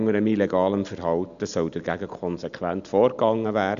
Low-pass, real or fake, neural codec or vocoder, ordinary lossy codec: 7.2 kHz; real; none; AAC, 96 kbps